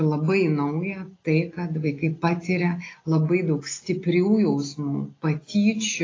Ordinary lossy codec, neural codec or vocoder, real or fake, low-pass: AAC, 32 kbps; none; real; 7.2 kHz